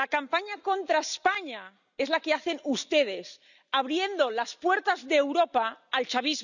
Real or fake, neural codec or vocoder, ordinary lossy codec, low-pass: real; none; none; 7.2 kHz